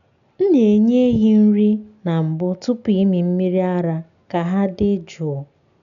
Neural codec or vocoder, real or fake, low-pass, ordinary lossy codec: none; real; 7.2 kHz; none